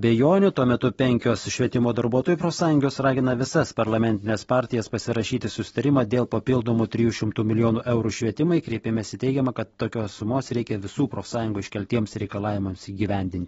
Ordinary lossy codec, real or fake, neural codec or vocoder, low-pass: AAC, 24 kbps; real; none; 19.8 kHz